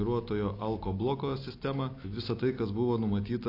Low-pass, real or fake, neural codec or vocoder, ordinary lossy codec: 5.4 kHz; real; none; AAC, 32 kbps